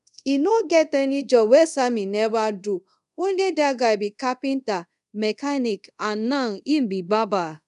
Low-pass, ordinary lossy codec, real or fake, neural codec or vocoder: 10.8 kHz; none; fake; codec, 24 kHz, 0.5 kbps, DualCodec